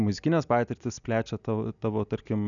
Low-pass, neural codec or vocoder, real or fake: 7.2 kHz; none; real